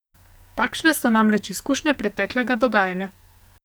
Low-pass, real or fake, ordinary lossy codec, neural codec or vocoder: none; fake; none; codec, 44.1 kHz, 2.6 kbps, SNAC